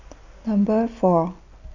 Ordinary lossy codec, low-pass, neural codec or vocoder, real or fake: none; 7.2 kHz; none; real